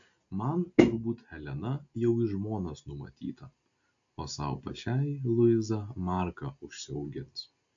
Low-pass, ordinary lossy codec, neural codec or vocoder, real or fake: 7.2 kHz; AAC, 64 kbps; none; real